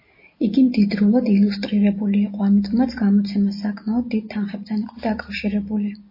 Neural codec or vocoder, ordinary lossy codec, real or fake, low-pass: none; MP3, 24 kbps; real; 5.4 kHz